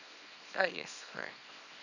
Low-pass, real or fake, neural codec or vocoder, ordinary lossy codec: 7.2 kHz; fake; codec, 24 kHz, 0.9 kbps, WavTokenizer, small release; none